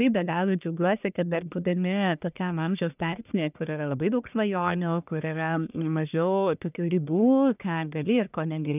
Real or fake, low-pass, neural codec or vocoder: fake; 3.6 kHz; codec, 44.1 kHz, 1.7 kbps, Pupu-Codec